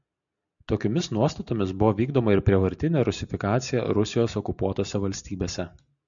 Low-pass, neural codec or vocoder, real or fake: 7.2 kHz; none; real